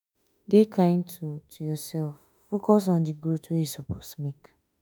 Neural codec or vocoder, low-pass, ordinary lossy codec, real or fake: autoencoder, 48 kHz, 32 numbers a frame, DAC-VAE, trained on Japanese speech; none; none; fake